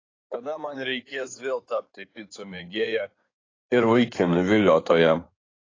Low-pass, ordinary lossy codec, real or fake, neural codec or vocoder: 7.2 kHz; AAC, 32 kbps; fake; codec, 16 kHz in and 24 kHz out, 2.2 kbps, FireRedTTS-2 codec